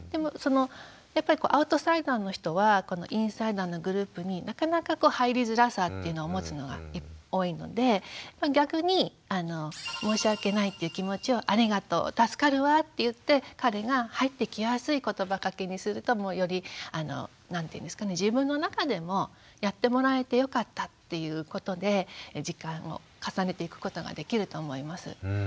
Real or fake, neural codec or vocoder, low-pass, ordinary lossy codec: real; none; none; none